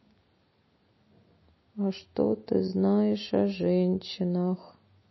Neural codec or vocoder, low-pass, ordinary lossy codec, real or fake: none; 7.2 kHz; MP3, 24 kbps; real